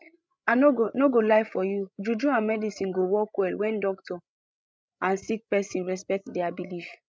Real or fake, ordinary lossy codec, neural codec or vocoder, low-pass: fake; none; codec, 16 kHz, 16 kbps, FreqCodec, larger model; none